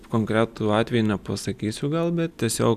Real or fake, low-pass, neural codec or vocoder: real; 14.4 kHz; none